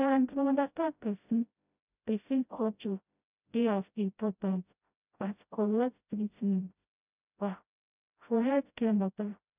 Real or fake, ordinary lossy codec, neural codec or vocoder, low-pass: fake; none; codec, 16 kHz, 0.5 kbps, FreqCodec, smaller model; 3.6 kHz